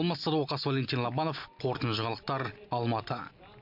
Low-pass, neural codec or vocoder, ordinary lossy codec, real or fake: 5.4 kHz; none; none; real